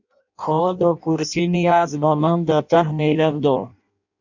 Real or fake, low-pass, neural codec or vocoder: fake; 7.2 kHz; codec, 16 kHz in and 24 kHz out, 0.6 kbps, FireRedTTS-2 codec